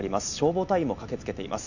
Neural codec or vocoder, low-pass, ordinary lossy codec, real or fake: none; 7.2 kHz; none; real